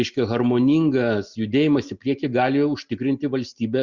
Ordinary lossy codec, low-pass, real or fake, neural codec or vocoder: Opus, 64 kbps; 7.2 kHz; real; none